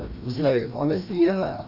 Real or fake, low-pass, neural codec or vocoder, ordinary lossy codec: fake; 5.4 kHz; codec, 16 kHz, 1 kbps, FreqCodec, larger model; none